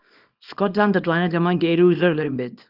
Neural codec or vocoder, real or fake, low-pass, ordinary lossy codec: codec, 24 kHz, 0.9 kbps, WavTokenizer, small release; fake; 5.4 kHz; Opus, 24 kbps